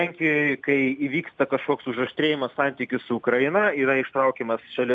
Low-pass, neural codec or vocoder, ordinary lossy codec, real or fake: 9.9 kHz; none; MP3, 96 kbps; real